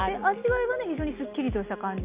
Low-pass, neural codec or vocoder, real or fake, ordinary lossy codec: 3.6 kHz; none; real; Opus, 64 kbps